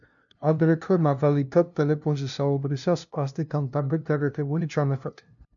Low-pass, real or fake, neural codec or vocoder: 7.2 kHz; fake; codec, 16 kHz, 0.5 kbps, FunCodec, trained on LibriTTS, 25 frames a second